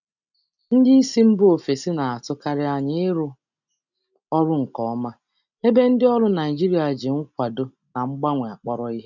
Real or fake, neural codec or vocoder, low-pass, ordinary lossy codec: real; none; 7.2 kHz; none